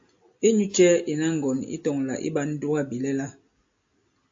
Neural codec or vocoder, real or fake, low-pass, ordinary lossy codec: none; real; 7.2 kHz; AAC, 48 kbps